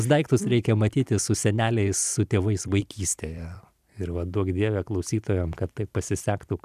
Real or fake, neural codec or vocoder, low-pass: fake; vocoder, 44.1 kHz, 128 mel bands every 256 samples, BigVGAN v2; 14.4 kHz